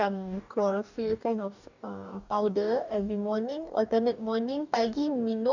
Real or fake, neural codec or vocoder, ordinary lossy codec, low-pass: fake; codec, 44.1 kHz, 2.6 kbps, DAC; none; 7.2 kHz